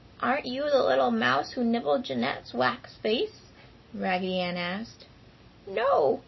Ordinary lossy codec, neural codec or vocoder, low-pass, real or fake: MP3, 24 kbps; none; 7.2 kHz; real